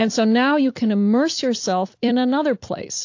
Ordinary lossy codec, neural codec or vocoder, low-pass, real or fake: AAC, 48 kbps; vocoder, 44.1 kHz, 128 mel bands every 256 samples, BigVGAN v2; 7.2 kHz; fake